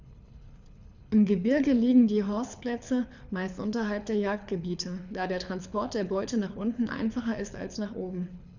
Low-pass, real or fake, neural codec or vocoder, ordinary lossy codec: 7.2 kHz; fake; codec, 24 kHz, 6 kbps, HILCodec; none